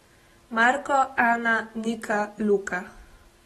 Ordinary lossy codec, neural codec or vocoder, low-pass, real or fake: AAC, 32 kbps; none; 19.8 kHz; real